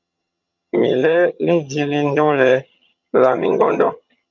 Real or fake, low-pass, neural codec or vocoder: fake; 7.2 kHz; vocoder, 22.05 kHz, 80 mel bands, HiFi-GAN